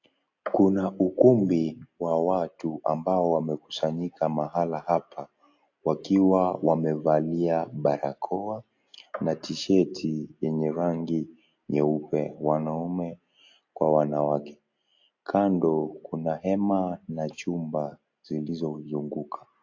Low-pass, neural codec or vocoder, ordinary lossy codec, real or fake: 7.2 kHz; none; AAC, 48 kbps; real